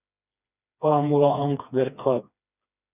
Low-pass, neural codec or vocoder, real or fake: 3.6 kHz; codec, 16 kHz, 2 kbps, FreqCodec, smaller model; fake